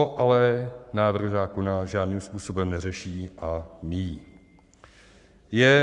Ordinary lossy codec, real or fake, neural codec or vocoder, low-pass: Opus, 64 kbps; fake; codec, 44.1 kHz, 7.8 kbps, Pupu-Codec; 10.8 kHz